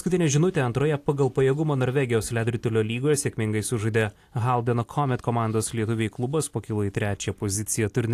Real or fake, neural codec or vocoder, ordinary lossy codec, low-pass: real; none; AAC, 64 kbps; 14.4 kHz